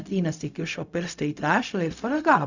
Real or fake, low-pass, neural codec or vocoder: fake; 7.2 kHz; codec, 16 kHz, 0.4 kbps, LongCat-Audio-Codec